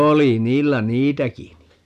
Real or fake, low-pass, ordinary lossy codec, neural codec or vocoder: real; 14.4 kHz; none; none